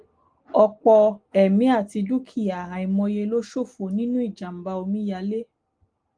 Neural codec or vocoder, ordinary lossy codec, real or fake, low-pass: none; Opus, 24 kbps; real; 9.9 kHz